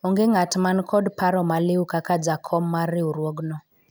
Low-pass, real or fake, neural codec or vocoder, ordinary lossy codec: none; real; none; none